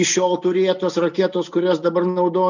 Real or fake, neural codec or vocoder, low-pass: real; none; 7.2 kHz